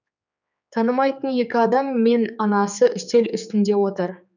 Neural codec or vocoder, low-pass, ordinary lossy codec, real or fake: codec, 16 kHz, 4 kbps, X-Codec, HuBERT features, trained on general audio; 7.2 kHz; none; fake